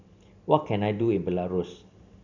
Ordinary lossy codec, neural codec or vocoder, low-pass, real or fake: none; none; 7.2 kHz; real